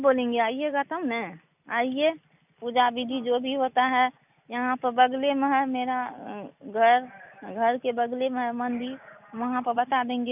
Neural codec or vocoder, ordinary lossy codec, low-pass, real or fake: none; none; 3.6 kHz; real